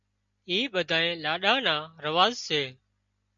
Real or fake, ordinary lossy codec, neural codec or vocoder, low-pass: real; MP3, 64 kbps; none; 7.2 kHz